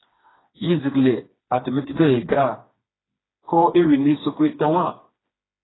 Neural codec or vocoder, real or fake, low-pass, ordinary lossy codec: codec, 16 kHz, 2 kbps, FreqCodec, smaller model; fake; 7.2 kHz; AAC, 16 kbps